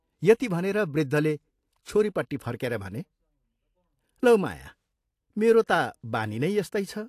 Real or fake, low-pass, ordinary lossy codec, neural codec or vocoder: real; 14.4 kHz; AAC, 64 kbps; none